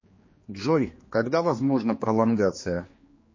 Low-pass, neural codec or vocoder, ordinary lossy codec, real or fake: 7.2 kHz; codec, 16 kHz, 2 kbps, X-Codec, HuBERT features, trained on general audio; MP3, 32 kbps; fake